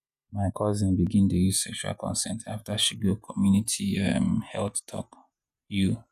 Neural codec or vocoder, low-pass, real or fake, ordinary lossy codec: vocoder, 48 kHz, 128 mel bands, Vocos; 14.4 kHz; fake; none